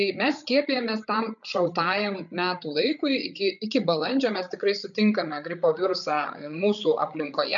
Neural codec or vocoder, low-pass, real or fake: codec, 16 kHz, 8 kbps, FreqCodec, larger model; 7.2 kHz; fake